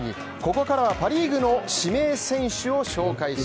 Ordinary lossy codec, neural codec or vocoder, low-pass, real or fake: none; none; none; real